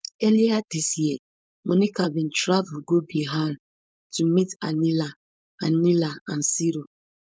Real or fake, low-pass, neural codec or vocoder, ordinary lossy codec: fake; none; codec, 16 kHz, 4.8 kbps, FACodec; none